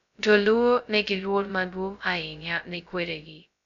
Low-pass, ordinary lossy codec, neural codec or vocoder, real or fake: 7.2 kHz; none; codec, 16 kHz, 0.2 kbps, FocalCodec; fake